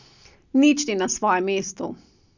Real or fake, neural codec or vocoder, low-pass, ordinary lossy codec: real; none; 7.2 kHz; none